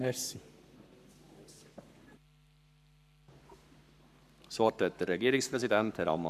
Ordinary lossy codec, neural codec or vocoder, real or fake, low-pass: MP3, 96 kbps; codec, 44.1 kHz, 7.8 kbps, Pupu-Codec; fake; 14.4 kHz